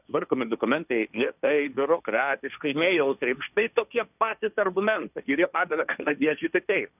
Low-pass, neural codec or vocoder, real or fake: 3.6 kHz; codec, 16 kHz, 1.1 kbps, Voila-Tokenizer; fake